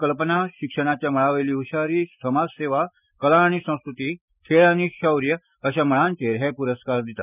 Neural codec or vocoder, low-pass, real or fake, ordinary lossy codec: none; 3.6 kHz; real; none